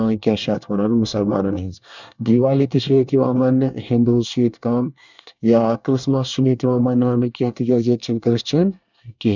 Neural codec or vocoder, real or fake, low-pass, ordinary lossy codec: codec, 24 kHz, 1 kbps, SNAC; fake; 7.2 kHz; none